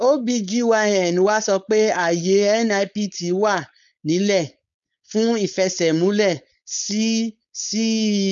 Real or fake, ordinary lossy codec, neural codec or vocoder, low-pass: fake; none; codec, 16 kHz, 4.8 kbps, FACodec; 7.2 kHz